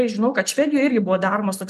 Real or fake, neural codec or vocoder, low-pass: real; none; 14.4 kHz